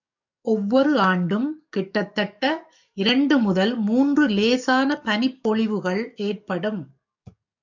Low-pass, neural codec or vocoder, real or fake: 7.2 kHz; codec, 44.1 kHz, 7.8 kbps, DAC; fake